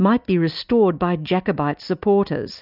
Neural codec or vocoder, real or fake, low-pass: none; real; 5.4 kHz